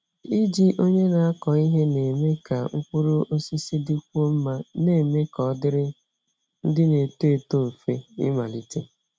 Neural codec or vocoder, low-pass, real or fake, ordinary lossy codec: none; none; real; none